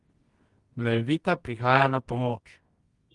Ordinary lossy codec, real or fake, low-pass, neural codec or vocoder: Opus, 24 kbps; fake; 10.8 kHz; codec, 24 kHz, 0.9 kbps, WavTokenizer, medium music audio release